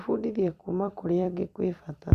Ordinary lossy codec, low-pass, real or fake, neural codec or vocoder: Opus, 64 kbps; 14.4 kHz; fake; vocoder, 44.1 kHz, 128 mel bands every 256 samples, BigVGAN v2